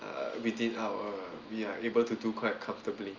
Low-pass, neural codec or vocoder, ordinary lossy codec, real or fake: 7.2 kHz; none; Opus, 32 kbps; real